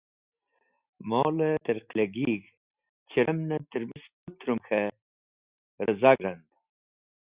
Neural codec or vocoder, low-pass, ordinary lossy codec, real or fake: none; 3.6 kHz; Opus, 64 kbps; real